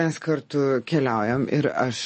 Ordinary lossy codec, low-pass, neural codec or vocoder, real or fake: MP3, 32 kbps; 10.8 kHz; none; real